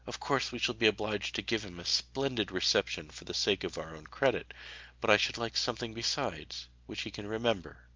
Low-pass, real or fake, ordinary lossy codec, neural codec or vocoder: 7.2 kHz; real; Opus, 24 kbps; none